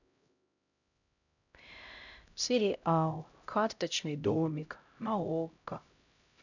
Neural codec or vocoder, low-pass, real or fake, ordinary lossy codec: codec, 16 kHz, 0.5 kbps, X-Codec, HuBERT features, trained on LibriSpeech; 7.2 kHz; fake; none